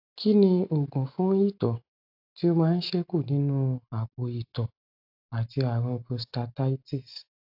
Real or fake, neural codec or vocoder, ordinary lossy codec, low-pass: real; none; AAC, 48 kbps; 5.4 kHz